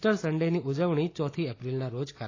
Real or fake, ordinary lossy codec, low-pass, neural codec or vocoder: real; AAC, 32 kbps; 7.2 kHz; none